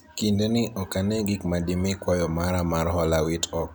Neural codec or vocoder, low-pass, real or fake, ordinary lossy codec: none; none; real; none